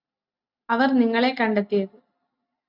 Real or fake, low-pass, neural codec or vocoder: real; 5.4 kHz; none